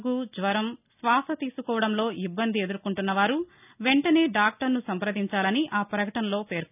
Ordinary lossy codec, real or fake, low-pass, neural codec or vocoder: none; real; 3.6 kHz; none